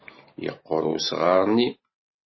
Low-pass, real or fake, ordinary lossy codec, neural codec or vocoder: 7.2 kHz; real; MP3, 24 kbps; none